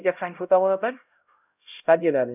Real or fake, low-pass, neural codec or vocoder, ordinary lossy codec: fake; 3.6 kHz; codec, 16 kHz, 0.5 kbps, X-Codec, HuBERT features, trained on LibriSpeech; none